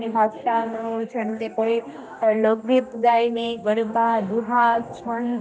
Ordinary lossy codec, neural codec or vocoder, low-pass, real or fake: none; codec, 16 kHz, 1 kbps, X-Codec, HuBERT features, trained on general audio; none; fake